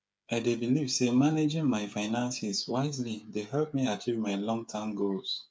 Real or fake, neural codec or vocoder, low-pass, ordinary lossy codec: fake; codec, 16 kHz, 8 kbps, FreqCodec, smaller model; none; none